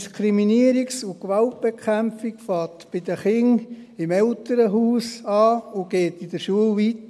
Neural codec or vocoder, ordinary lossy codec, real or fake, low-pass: none; none; real; none